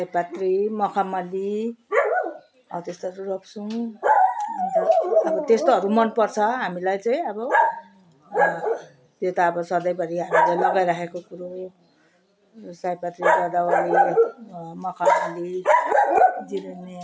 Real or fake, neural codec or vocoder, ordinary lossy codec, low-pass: real; none; none; none